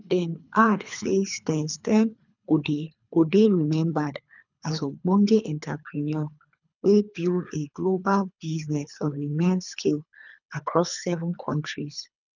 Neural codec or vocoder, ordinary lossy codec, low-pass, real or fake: codec, 24 kHz, 3 kbps, HILCodec; none; 7.2 kHz; fake